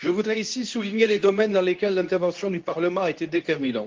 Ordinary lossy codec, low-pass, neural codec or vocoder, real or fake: Opus, 32 kbps; 7.2 kHz; codec, 24 kHz, 0.9 kbps, WavTokenizer, medium speech release version 2; fake